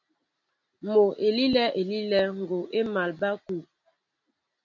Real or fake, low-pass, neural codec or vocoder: real; 7.2 kHz; none